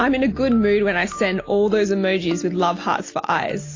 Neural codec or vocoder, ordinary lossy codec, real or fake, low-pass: none; AAC, 32 kbps; real; 7.2 kHz